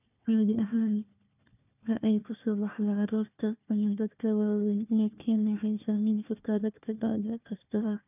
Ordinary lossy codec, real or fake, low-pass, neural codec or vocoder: none; fake; 3.6 kHz; codec, 16 kHz, 1 kbps, FunCodec, trained on Chinese and English, 50 frames a second